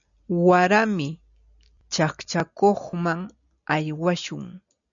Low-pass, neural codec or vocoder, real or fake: 7.2 kHz; none; real